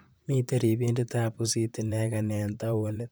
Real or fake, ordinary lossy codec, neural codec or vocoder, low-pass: fake; none; vocoder, 44.1 kHz, 128 mel bands, Pupu-Vocoder; none